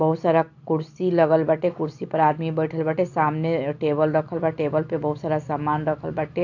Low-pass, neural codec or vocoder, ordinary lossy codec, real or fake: 7.2 kHz; none; AAC, 48 kbps; real